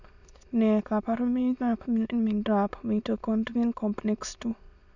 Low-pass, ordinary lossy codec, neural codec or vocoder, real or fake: 7.2 kHz; none; autoencoder, 22.05 kHz, a latent of 192 numbers a frame, VITS, trained on many speakers; fake